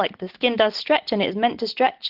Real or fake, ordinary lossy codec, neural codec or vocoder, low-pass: real; Opus, 16 kbps; none; 5.4 kHz